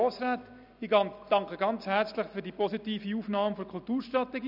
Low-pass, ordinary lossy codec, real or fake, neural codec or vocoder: 5.4 kHz; none; real; none